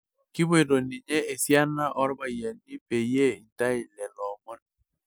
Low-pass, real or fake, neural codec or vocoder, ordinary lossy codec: none; real; none; none